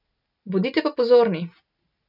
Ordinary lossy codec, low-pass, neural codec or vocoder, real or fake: none; 5.4 kHz; none; real